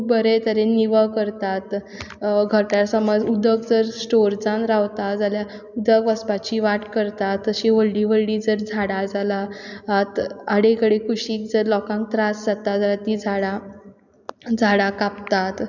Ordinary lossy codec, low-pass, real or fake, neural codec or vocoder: none; 7.2 kHz; real; none